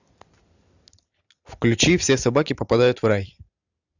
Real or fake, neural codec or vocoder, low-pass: real; none; 7.2 kHz